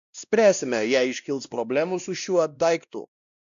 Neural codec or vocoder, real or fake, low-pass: codec, 16 kHz, 1 kbps, X-Codec, WavLM features, trained on Multilingual LibriSpeech; fake; 7.2 kHz